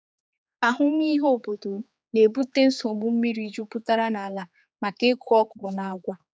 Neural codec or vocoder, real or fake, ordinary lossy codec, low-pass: codec, 16 kHz, 4 kbps, X-Codec, HuBERT features, trained on general audio; fake; none; none